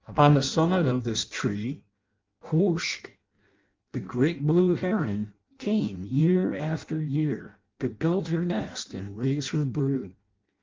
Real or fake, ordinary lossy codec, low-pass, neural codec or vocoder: fake; Opus, 32 kbps; 7.2 kHz; codec, 16 kHz in and 24 kHz out, 0.6 kbps, FireRedTTS-2 codec